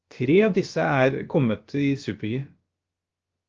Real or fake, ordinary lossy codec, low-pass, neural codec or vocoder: fake; Opus, 24 kbps; 7.2 kHz; codec, 16 kHz, about 1 kbps, DyCAST, with the encoder's durations